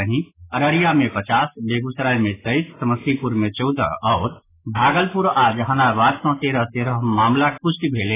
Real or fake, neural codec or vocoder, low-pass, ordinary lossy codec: real; none; 3.6 kHz; AAC, 16 kbps